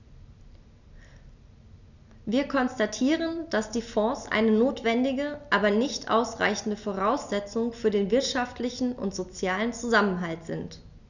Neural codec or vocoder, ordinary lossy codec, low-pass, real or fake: none; none; 7.2 kHz; real